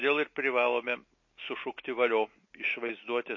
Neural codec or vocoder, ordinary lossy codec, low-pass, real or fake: none; MP3, 32 kbps; 7.2 kHz; real